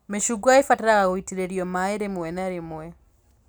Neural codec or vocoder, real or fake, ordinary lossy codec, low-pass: none; real; none; none